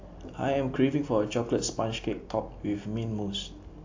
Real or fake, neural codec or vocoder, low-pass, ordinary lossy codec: real; none; 7.2 kHz; AAC, 48 kbps